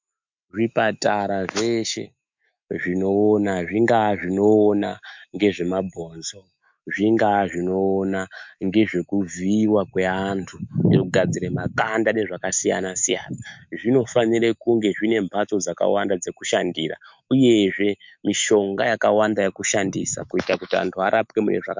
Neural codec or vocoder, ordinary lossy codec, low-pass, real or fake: autoencoder, 48 kHz, 128 numbers a frame, DAC-VAE, trained on Japanese speech; MP3, 64 kbps; 7.2 kHz; fake